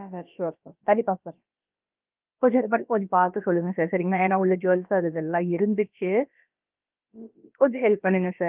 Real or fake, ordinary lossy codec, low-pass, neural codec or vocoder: fake; Opus, 32 kbps; 3.6 kHz; codec, 16 kHz, about 1 kbps, DyCAST, with the encoder's durations